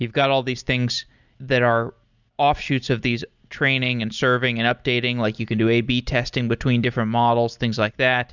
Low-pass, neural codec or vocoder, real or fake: 7.2 kHz; none; real